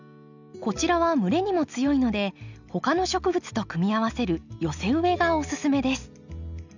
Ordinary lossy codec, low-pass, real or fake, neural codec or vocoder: none; 7.2 kHz; real; none